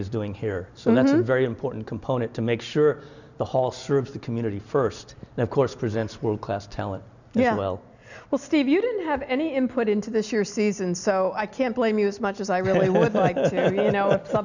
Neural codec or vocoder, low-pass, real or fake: none; 7.2 kHz; real